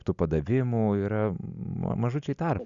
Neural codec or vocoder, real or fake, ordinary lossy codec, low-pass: none; real; Opus, 64 kbps; 7.2 kHz